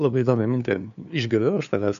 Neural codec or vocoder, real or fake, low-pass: codec, 16 kHz, 2 kbps, FunCodec, trained on LibriTTS, 25 frames a second; fake; 7.2 kHz